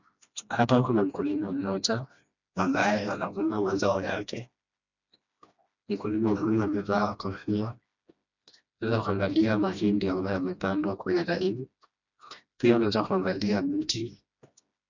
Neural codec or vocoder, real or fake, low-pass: codec, 16 kHz, 1 kbps, FreqCodec, smaller model; fake; 7.2 kHz